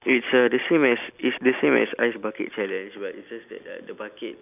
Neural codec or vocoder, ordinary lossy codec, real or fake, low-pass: none; none; real; 3.6 kHz